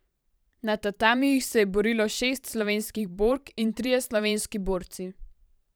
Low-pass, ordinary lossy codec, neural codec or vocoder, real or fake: none; none; vocoder, 44.1 kHz, 128 mel bands every 512 samples, BigVGAN v2; fake